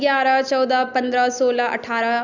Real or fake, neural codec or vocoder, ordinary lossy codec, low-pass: real; none; none; 7.2 kHz